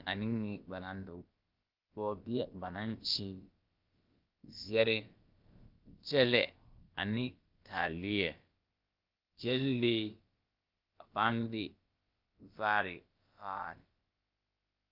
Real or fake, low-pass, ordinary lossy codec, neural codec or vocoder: fake; 5.4 kHz; Opus, 24 kbps; codec, 16 kHz, about 1 kbps, DyCAST, with the encoder's durations